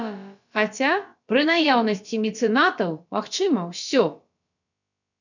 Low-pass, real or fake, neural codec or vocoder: 7.2 kHz; fake; codec, 16 kHz, about 1 kbps, DyCAST, with the encoder's durations